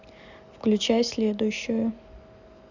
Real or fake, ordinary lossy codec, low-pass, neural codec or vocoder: real; none; 7.2 kHz; none